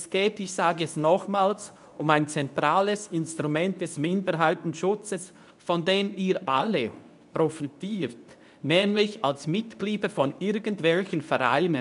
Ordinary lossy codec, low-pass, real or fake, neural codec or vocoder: none; 10.8 kHz; fake; codec, 24 kHz, 0.9 kbps, WavTokenizer, medium speech release version 2